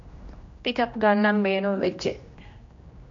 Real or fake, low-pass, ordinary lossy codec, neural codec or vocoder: fake; 7.2 kHz; MP3, 48 kbps; codec, 16 kHz, 1 kbps, X-Codec, HuBERT features, trained on general audio